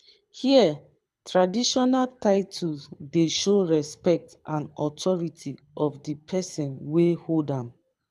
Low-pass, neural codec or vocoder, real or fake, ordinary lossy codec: none; codec, 24 kHz, 6 kbps, HILCodec; fake; none